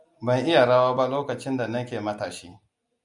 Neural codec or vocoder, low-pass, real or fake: none; 10.8 kHz; real